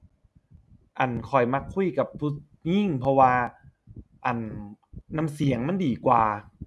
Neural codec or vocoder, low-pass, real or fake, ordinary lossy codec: none; none; real; none